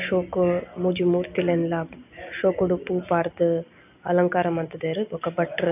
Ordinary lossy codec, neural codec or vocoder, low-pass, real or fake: none; none; 3.6 kHz; real